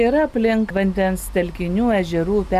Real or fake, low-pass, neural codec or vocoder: real; 14.4 kHz; none